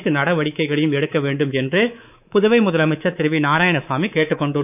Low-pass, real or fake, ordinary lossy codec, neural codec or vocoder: 3.6 kHz; fake; none; codec, 24 kHz, 3.1 kbps, DualCodec